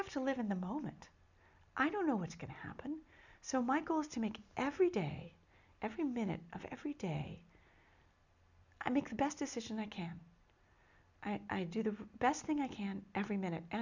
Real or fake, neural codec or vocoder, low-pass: real; none; 7.2 kHz